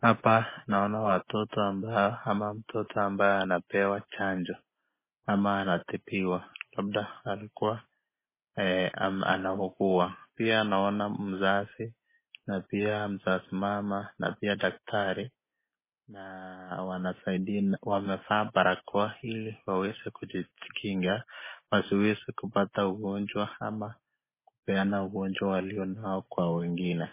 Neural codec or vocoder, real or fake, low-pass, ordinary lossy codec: none; real; 3.6 kHz; MP3, 16 kbps